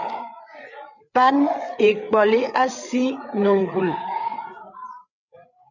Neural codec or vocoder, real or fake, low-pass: codec, 16 kHz, 8 kbps, FreqCodec, larger model; fake; 7.2 kHz